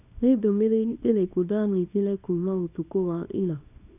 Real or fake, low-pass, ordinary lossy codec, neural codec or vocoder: fake; 3.6 kHz; none; codec, 24 kHz, 0.9 kbps, WavTokenizer, small release